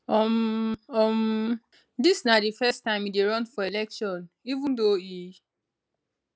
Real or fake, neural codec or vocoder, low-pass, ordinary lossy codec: real; none; none; none